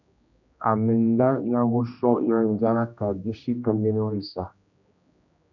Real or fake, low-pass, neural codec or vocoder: fake; 7.2 kHz; codec, 16 kHz, 1 kbps, X-Codec, HuBERT features, trained on general audio